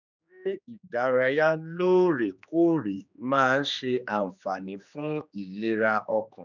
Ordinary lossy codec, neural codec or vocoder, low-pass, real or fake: none; codec, 16 kHz, 2 kbps, X-Codec, HuBERT features, trained on general audio; 7.2 kHz; fake